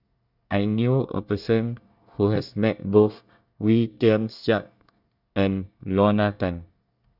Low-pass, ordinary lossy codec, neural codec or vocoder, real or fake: 5.4 kHz; none; codec, 24 kHz, 1 kbps, SNAC; fake